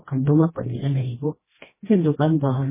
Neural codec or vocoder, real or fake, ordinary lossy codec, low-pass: codec, 16 kHz, 1 kbps, FreqCodec, smaller model; fake; MP3, 16 kbps; 3.6 kHz